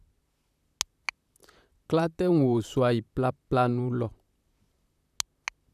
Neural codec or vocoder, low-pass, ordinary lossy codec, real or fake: vocoder, 44.1 kHz, 128 mel bands, Pupu-Vocoder; 14.4 kHz; none; fake